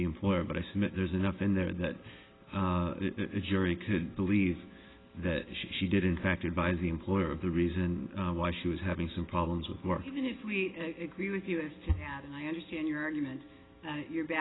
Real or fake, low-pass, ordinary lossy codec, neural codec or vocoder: real; 7.2 kHz; AAC, 16 kbps; none